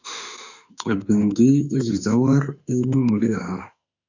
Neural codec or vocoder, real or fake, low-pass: autoencoder, 48 kHz, 32 numbers a frame, DAC-VAE, trained on Japanese speech; fake; 7.2 kHz